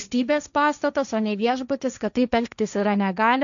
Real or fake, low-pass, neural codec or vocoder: fake; 7.2 kHz; codec, 16 kHz, 1.1 kbps, Voila-Tokenizer